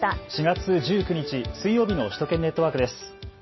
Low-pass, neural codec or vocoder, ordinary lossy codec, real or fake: 7.2 kHz; none; MP3, 24 kbps; real